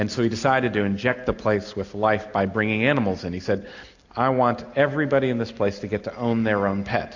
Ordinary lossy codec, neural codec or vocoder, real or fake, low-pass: AAC, 48 kbps; none; real; 7.2 kHz